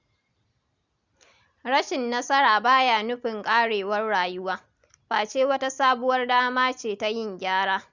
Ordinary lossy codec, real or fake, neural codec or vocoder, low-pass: Opus, 64 kbps; real; none; 7.2 kHz